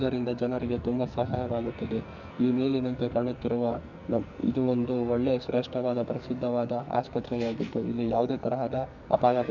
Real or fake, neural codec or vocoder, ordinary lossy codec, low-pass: fake; codec, 44.1 kHz, 2.6 kbps, SNAC; none; 7.2 kHz